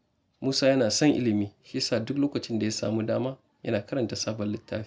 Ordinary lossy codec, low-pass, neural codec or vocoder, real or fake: none; none; none; real